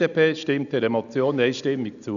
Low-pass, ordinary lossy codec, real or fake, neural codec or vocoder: 7.2 kHz; none; real; none